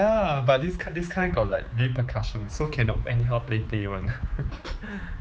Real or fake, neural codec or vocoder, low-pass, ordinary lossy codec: fake; codec, 16 kHz, 4 kbps, X-Codec, HuBERT features, trained on general audio; none; none